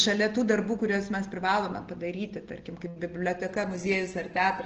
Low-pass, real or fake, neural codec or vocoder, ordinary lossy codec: 7.2 kHz; real; none; Opus, 16 kbps